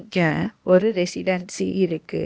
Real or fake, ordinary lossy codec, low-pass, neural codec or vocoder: fake; none; none; codec, 16 kHz, 0.8 kbps, ZipCodec